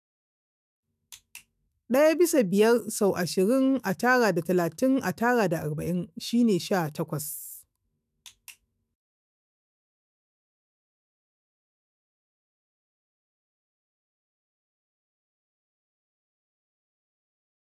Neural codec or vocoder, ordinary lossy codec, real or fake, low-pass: autoencoder, 48 kHz, 128 numbers a frame, DAC-VAE, trained on Japanese speech; none; fake; 14.4 kHz